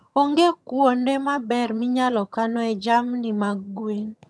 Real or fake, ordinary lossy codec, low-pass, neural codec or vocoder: fake; none; none; vocoder, 22.05 kHz, 80 mel bands, HiFi-GAN